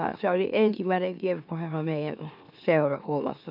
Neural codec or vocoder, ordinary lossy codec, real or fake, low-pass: autoencoder, 44.1 kHz, a latent of 192 numbers a frame, MeloTTS; none; fake; 5.4 kHz